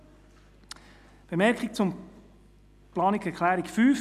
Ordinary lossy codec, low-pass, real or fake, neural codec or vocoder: none; 14.4 kHz; real; none